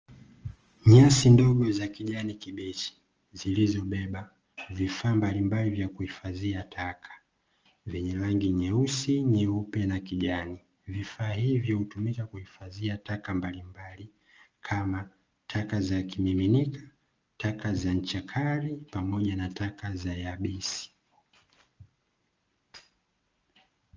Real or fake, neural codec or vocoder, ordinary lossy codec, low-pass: real; none; Opus, 24 kbps; 7.2 kHz